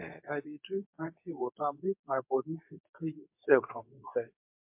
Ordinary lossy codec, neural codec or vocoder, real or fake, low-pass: MP3, 32 kbps; codec, 24 kHz, 0.9 kbps, WavTokenizer, medium speech release version 2; fake; 3.6 kHz